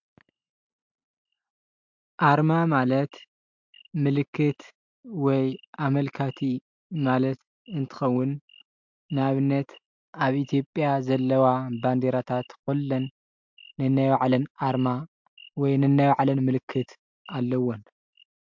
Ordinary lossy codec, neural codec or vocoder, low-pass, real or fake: MP3, 64 kbps; none; 7.2 kHz; real